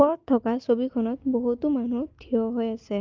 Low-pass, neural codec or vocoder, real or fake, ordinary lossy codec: 7.2 kHz; none; real; Opus, 24 kbps